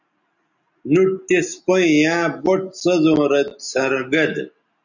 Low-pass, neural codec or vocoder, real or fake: 7.2 kHz; none; real